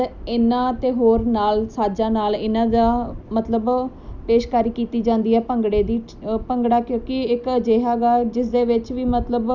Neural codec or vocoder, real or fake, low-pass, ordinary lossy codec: none; real; 7.2 kHz; none